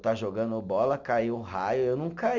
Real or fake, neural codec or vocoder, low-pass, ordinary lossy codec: real; none; 7.2 kHz; none